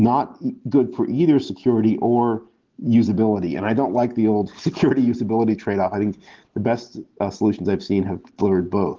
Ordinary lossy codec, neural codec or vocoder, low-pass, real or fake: Opus, 16 kbps; none; 7.2 kHz; real